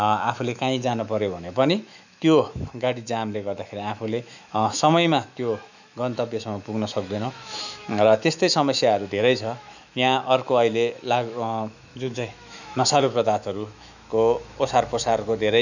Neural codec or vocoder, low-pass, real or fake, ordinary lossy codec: autoencoder, 48 kHz, 128 numbers a frame, DAC-VAE, trained on Japanese speech; 7.2 kHz; fake; none